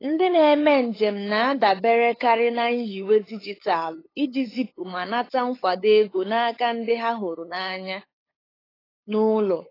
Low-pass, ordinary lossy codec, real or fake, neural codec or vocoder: 5.4 kHz; AAC, 24 kbps; fake; codec, 16 kHz, 8 kbps, FunCodec, trained on LibriTTS, 25 frames a second